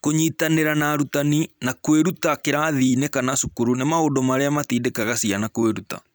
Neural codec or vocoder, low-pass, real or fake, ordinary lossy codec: vocoder, 44.1 kHz, 128 mel bands every 256 samples, BigVGAN v2; none; fake; none